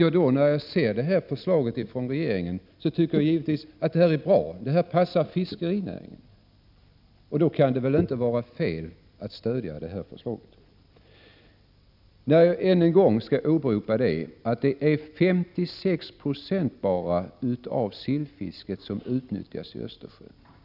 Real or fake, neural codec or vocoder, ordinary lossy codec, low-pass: real; none; none; 5.4 kHz